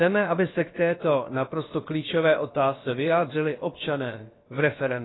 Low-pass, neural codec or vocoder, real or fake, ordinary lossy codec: 7.2 kHz; codec, 16 kHz, about 1 kbps, DyCAST, with the encoder's durations; fake; AAC, 16 kbps